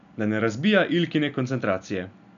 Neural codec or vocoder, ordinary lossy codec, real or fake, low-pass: none; none; real; 7.2 kHz